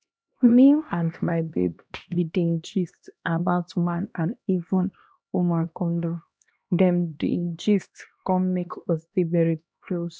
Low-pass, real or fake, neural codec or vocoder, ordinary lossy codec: none; fake; codec, 16 kHz, 1 kbps, X-Codec, HuBERT features, trained on LibriSpeech; none